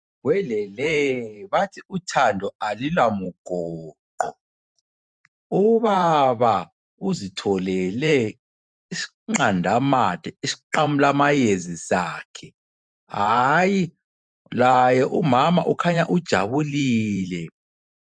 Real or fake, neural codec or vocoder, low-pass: fake; vocoder, 44.1 kHz, 128 mel bands every 512 samples, BigVGAN v2; 9.9 kHz